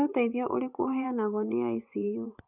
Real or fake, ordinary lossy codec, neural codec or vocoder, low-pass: fake; none; vocoder, 24 kHz, 100 mel bands, Vocos; 3.6 kHz